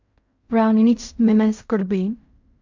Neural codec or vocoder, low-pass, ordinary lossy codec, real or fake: codec, 16 kHz in and 24 kHz out, 0.4 kbps, LongCat-Audio-Codec, fine tuned four codebook decoder; 7.2 kHz; MP3, 64 kbps; fake